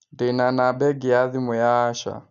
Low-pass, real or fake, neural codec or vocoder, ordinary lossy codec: 7.2 kHz; real; none; none